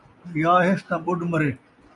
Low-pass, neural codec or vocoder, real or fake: 10.8 kHz; none; real